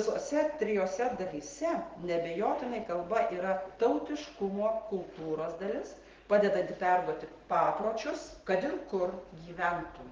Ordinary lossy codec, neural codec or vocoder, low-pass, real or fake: Opus, 16 kbps; none; 7.2 kHz; real